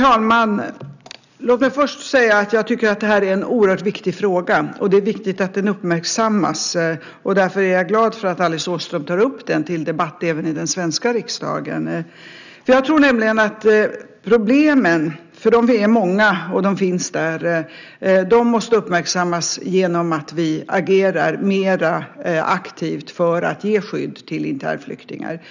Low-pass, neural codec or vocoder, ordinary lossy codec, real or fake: 7.2 kHz; none; none; real